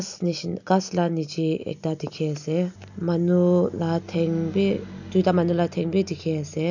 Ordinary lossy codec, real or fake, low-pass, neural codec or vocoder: none; real; 7.2 kHz; none